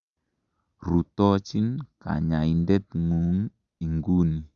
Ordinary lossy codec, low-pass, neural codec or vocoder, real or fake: Opus, 64 kbps; 7.2 kHz; none; real